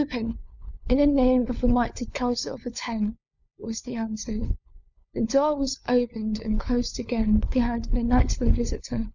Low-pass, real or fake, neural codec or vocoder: 7.2 kHz; fake; codec, 16 kHz, 4 kbps, FunCodec, trained on LibriTTS, 50 frames a second